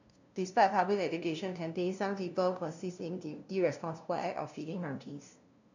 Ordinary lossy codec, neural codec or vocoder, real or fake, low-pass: none; codec, 16 kHz, 0.5 kbps, FunCodec, trained on LibriTTS, 25 frames a second; fake; 7.2 kHz